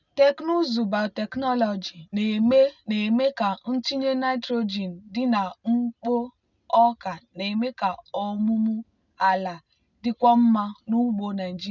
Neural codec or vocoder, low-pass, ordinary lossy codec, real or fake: none; 7.2 kHz; none; real